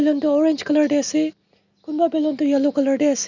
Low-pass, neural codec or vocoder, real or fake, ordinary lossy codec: 7.2 kHz; none; real; none